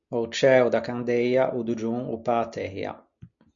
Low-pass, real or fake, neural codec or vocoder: 7.2 kHz; real; none